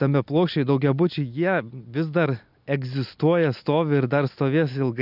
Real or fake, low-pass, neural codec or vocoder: real; 5.4 kHz; none